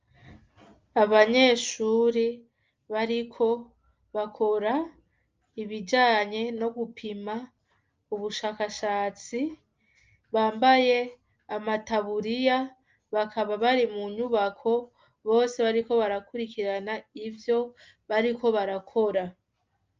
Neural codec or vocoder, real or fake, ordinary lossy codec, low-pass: none; real; Opus, 24 kbps; 7.2 kHz